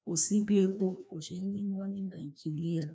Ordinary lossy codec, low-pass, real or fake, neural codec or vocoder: none; none; fake; codec, 16 kHz, 1 kbps, FreqCodec, larger model